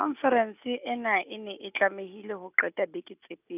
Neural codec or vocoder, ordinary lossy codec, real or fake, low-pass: none; none; real; 3.6 kHz